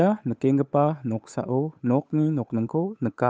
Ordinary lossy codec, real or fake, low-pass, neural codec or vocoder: none; fake; none; codec, 16 kHz, 8 kbps, FunCodec, trained on Chinese and English, 25 frames a second